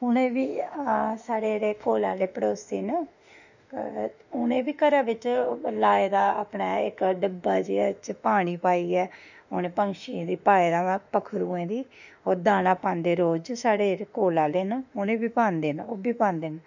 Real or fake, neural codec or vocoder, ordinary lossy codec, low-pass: fake; autoencoder, 48 kHz, 32 numbers a frame, DAC-VAE, trained on Japanese speech; none; 7.2 kHz